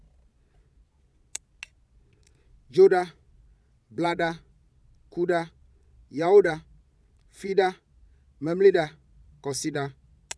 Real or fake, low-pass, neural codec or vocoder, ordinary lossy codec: fake; none; vocoder, 22.05 kHz, 80 mel bands, Vocos; none